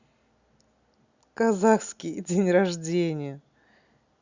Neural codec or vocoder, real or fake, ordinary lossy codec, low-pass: none; real; Opus, 64 kbps; 7.2 kHz